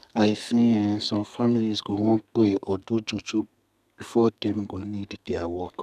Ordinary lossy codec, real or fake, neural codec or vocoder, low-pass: none; fake; codec, 32 kHz, 1.9 kbps, SNAC; 14.4 kHz